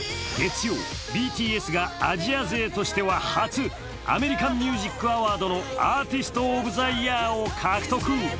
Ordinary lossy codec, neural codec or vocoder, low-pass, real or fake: none; none; none; real